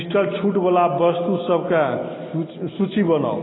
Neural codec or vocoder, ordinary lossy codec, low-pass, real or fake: none; AAC, 16 kbps; 7.2 kHz; real